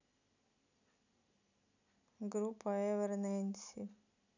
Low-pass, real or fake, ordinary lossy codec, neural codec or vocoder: 7.2 kHz; real; none; none